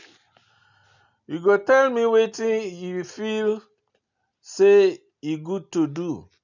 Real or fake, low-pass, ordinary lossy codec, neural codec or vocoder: real; 7.2 kHz; none; none